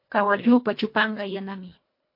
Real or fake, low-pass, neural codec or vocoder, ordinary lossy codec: fake; 5.4 kHz; codec, 24 kHz, 1.5 kbps, HILCodec; MP3, 32 kbps